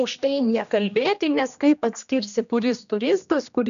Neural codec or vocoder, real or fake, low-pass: codec, 16 kHz, 1 kbps, X-Codec, HuBERT features, trained on general audio; fake; 7.2 kHz